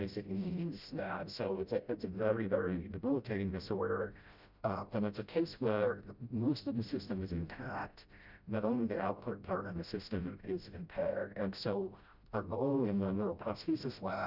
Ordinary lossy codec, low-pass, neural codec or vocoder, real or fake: Opus, 64 kbps; 5.4 kHz; codec, 16 kHz, 0.5 kbps, FreqCodec, smaller model; fake